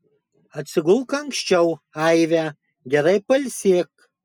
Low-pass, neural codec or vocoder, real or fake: 19.8 kHz; none; real